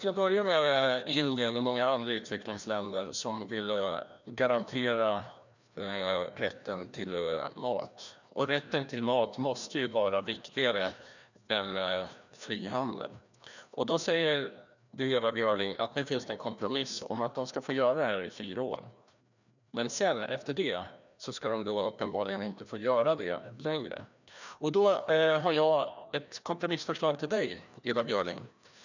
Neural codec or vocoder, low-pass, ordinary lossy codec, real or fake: codec, 16 kHz, 1 kbps, FreqCodec, larger model; 7.2 kHz; none; fake